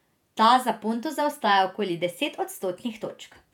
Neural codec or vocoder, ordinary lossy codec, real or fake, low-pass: vocoder, 48 kHz, 128 mel bands, Vocos; none; fake; 19.8 kHz